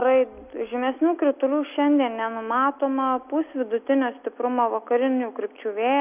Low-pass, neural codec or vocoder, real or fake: 3.6 kHz; none; real